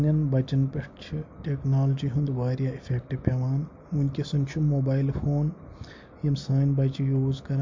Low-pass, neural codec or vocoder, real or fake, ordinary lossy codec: 7.2 kHz; none; real; MP3, 48 kbps